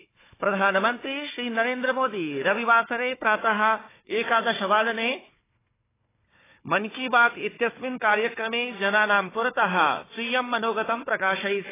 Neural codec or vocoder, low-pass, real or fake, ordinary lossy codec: codec, 16 kHz, 4 kbps, FunCodec, trained on Chinese and English, 50 frames a second; 3.6 kHz; fake; AAC, 16 kbps